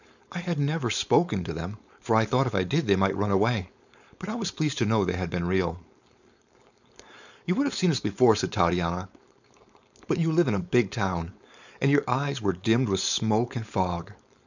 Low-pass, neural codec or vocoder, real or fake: 7.2 kHz; codec, 16 kHz, 4.8 kbps, FACodec; fake